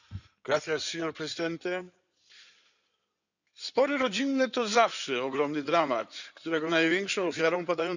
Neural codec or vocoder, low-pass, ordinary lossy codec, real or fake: codec, 16 kHz in and 24 kHz out, 2.2 kbps, FireRedTTS-2 codec; 7.2 kHz; none; fake